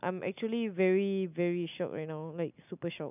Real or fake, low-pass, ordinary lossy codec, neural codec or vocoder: real; 3.6 kHz; none; none